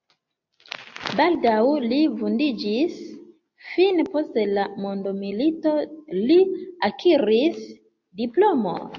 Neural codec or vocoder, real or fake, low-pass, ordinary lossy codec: none; real; 7.2 kHz; AAC, 48 kbps